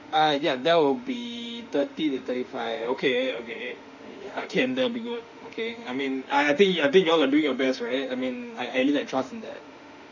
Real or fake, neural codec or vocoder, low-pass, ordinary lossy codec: fake; autoencoder, 48 kHz, 32 numbers a frame, DAC-VAE, trained on Japanese speech; 7.2 kHz; none